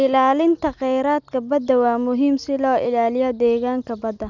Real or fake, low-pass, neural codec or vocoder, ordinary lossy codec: real; 7.2 kHz; none; none